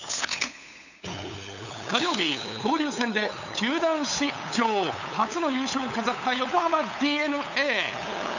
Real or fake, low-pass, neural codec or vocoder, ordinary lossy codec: fake; 7.2 kHz; codec, 16 kHz, 8 kbps, FunCodec, trained on LibriTTS, 25 frames a second; none